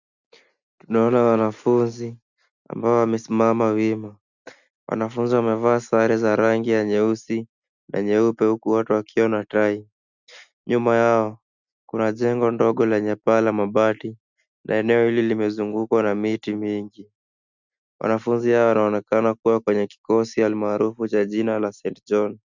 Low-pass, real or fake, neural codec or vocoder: 7.2 kHz; real; none